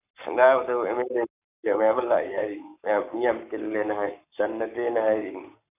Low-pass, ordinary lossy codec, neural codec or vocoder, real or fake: 3.6 kHz; none; codec, 44.1 kHz, 7.8 kbps, Pupu-Codec; fake